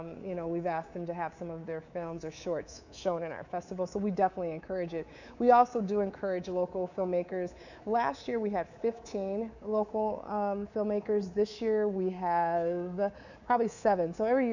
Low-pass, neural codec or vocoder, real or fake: 7.2 kHz; codec, 24 kHz, 3.1 kbps, DualCodec; fake